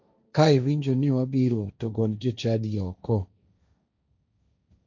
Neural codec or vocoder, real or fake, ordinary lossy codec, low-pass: codec, 16 kHz, 1.1 kbps, Voila-Tokenizer; fake; none; 7.2 kHz